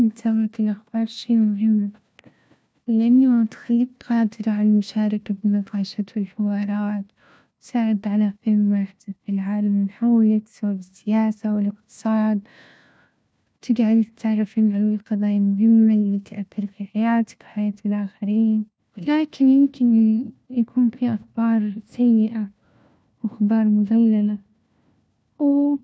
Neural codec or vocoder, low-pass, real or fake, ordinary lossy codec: codec, 16 kHz, 1 kbps, FunCodec, trained on LibriTTS, 50 frames a second; none; fake; none